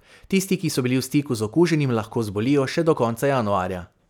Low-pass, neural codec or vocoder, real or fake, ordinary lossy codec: 19.8 kHz; none; real; none